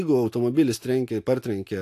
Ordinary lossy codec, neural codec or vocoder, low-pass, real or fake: AAC, 64 kbps; none; 14.4 kHz; real